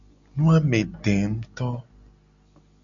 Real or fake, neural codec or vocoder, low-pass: real; none; 7.2 kHz